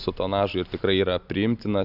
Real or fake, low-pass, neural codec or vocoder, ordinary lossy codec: real; 5.4 kHz; none; AAC, 48 kbps